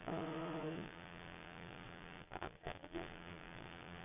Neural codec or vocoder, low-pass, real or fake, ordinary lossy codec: vocoder, 22.05 kHz, 80 mel bands, Vocos; 3.6 kHz; fake; none